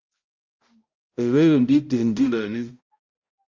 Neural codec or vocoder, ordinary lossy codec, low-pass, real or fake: codec, 16 kHz, 0.5 kbps, X-Codec, HuBERT features, trained on balanced general audio; Opus, 32 kbps; 7.2 kHz; fake